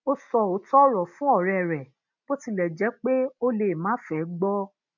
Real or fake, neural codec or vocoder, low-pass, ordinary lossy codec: real; none; 7.2 kHz; none